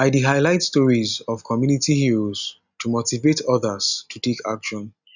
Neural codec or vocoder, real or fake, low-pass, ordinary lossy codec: none; real; 7.2 kHz; none